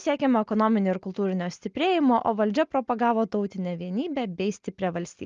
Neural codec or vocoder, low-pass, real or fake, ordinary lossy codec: none; 7.2 kHz; real; Opus, 32 kbps